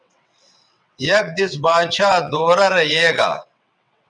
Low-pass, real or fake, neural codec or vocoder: 9.9 kHz; fake; vocoder, 22.05 kHz, 80 mel bands, WaveNeXt